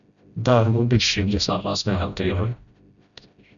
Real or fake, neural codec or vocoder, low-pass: fake; codec, 16 kHz, 0.5 kbps, FreqCodec, smaller model; 7.2 kHz